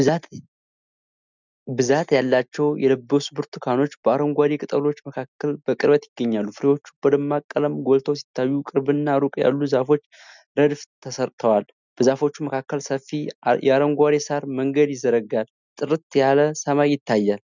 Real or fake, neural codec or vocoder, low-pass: real; none; 7.2 kHz